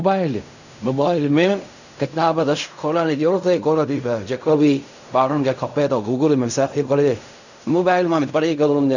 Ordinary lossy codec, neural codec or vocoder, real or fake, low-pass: none; codec, 16 kHz in and 24 kHz out, 0.4 kbps, LongCat-Audio-Codec, fine tuned four codebook decoder; fake; 7.2 kHz